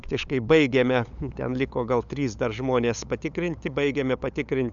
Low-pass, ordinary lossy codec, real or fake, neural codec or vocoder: 7.2 kHz; MP3, 96 kbps; fake; codec, 16 kHz, 8 kbps, FunCodec, trained on LibriTTS, 25 frames a second